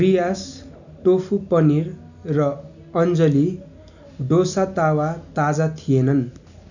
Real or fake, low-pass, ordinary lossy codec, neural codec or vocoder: real; 7.2 kHz; none; none